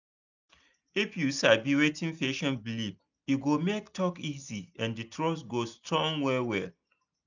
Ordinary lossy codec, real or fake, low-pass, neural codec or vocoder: none; real; 7.2 kHz; none